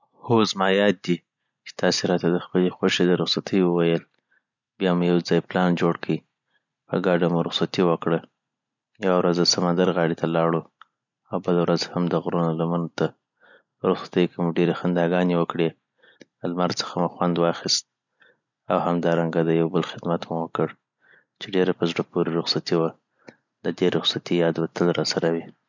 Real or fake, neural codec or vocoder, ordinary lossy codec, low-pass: real; none; none; 7.2 kHz